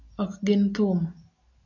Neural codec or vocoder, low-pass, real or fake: none; 7.2 kHz; real